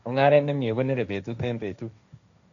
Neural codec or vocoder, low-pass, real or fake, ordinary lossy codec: codec, 16 kHz, 1.1 kbps, Voila-Tokenizer; 7.2 kHz; fake; none